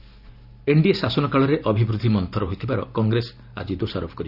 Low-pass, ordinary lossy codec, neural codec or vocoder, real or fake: 5.4 kHz; none; none; real